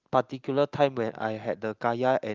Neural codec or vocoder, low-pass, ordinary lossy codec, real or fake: none; 7.2 kHz; Opus, 24 kbps; real